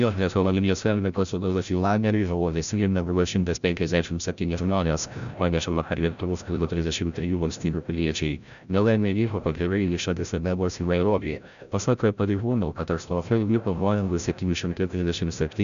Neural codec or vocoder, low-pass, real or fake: codec, 16 kHz, 0.5 kbps, FreqCodec, larger model; 7.2 kHz; fake